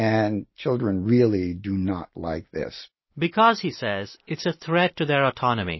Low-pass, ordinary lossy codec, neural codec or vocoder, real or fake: 7.2 kHz; MP3, 24 kbps; none; real